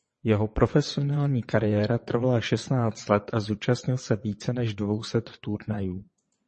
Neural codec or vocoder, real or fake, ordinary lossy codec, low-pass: vocoder, 22.05 kHz, 80 mel bands, WaveNeXt; fake; MP3, 32 kbps; 9.9 kHz